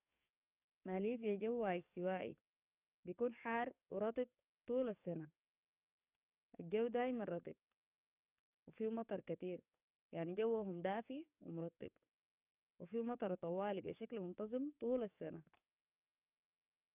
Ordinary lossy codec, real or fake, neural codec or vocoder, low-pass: none; fake; codec, 44.1 kHz, 7.8 kbps, DAC; 3.6 kHz